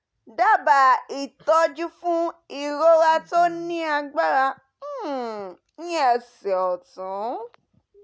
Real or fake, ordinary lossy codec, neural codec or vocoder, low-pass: real; none; none; none